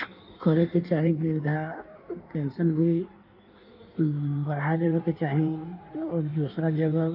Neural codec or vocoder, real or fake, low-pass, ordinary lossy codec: codec, 16 kHz in and 24 kHz out, 1.1 kbps, FireRedTTS-2 codec; fake; 5.4 kHz; none